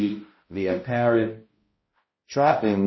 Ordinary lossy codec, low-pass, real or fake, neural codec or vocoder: MP3, 24 kbps; 7.2 kHz; fake; codec, 16 kHz, 0.5 kbps, X-Codec, HuBERT features, trained on balanced general audio